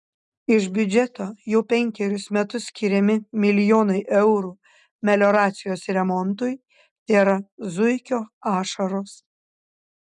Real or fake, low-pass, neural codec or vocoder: real; 10.8 kHz; none